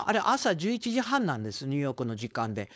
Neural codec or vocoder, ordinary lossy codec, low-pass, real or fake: codec, 16 kHz, 4.8 kbps, FACodec; none; none; fake